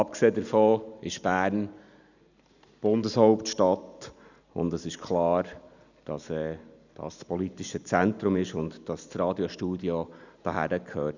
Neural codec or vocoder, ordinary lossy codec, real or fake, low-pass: none; none; real; 7.2 kHz